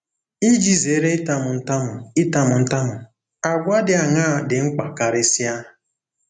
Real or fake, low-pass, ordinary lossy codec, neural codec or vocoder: real; 9.9 kHz; none; none